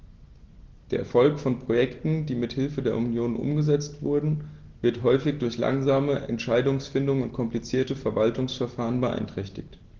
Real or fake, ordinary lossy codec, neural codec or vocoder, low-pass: real; Opus, 16 kbps; none; 7.2 kHz